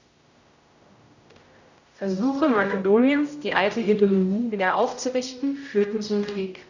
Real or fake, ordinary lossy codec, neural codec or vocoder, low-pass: fake; none; codec, 16 kHz, 0.5 kbps, X-Codec, HuBERT features, trained on general audio; 7.2 kHz